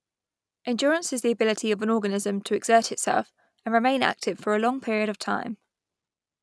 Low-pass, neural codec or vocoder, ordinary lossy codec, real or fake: none; none; none; real